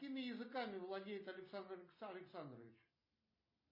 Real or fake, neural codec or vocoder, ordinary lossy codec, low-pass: real; none; MP3, 24 kbps; 5.4 kHz